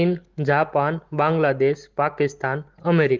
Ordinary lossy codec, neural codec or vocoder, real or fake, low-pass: Opus, 16 kbps; none; real; 7.2 kHz